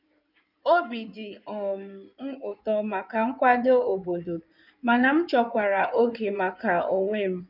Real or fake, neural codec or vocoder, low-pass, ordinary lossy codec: fake; codec, 16 kHz in and 24 kHz out, 2.2 kbps, FireRedTTS-2 codec; 5.4 kHz; none